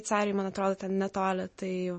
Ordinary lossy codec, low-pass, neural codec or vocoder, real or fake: MP3, 32 kbps; 10.8 kHz; none; real